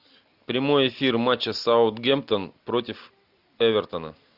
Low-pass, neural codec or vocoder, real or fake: 5.4 kHz; none; real